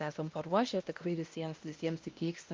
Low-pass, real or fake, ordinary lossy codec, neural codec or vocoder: 7.2 kHz; fake; Opus, 24 kbps; codec, 16 kHz, 1 kbps, X-Codec, WavLM features, trained on Multilingual LibriSpeech